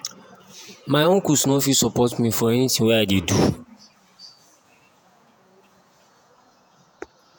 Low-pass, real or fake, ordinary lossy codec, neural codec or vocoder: none; fake; none; vocoder, 48 kHz, 128 mel bands, Vocos